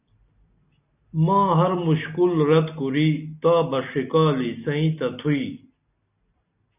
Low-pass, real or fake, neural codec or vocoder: 3.6 kHz; real; none